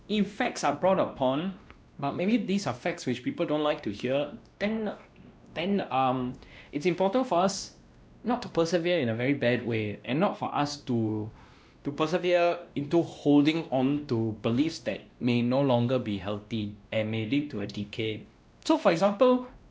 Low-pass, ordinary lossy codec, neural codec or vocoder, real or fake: none; none; codec, 16 kHz, 1 kbps, X-Codec, WavLM features, trained on Multilingual LibriSpeech; fake